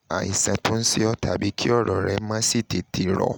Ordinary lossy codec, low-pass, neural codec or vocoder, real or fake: none; none; none; real